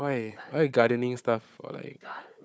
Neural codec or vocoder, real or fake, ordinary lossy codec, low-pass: codec, 16 kHz, 4 kbps, FunCodec, trained on Chinese and English, 50 frames a second; fake; none; none